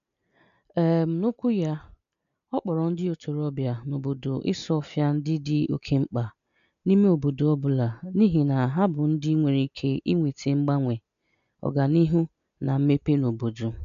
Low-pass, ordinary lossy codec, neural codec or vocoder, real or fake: 7.2 kHz; none; none; real